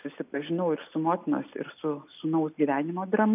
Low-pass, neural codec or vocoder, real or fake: 3.6 kHz; none; real